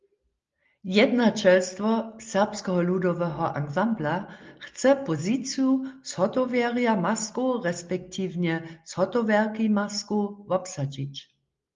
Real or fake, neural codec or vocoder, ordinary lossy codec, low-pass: real; none; Opus, 32 kbps; 7.2 kHz